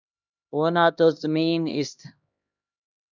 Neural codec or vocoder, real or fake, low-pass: codec, 16 kHz, 1 kbps, X-Codec, HuBERT features, trained on LibriSpeech; fake; 7.2 kHz